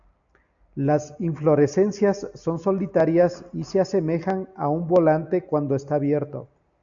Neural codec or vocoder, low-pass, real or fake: none; 7.2 kHz; real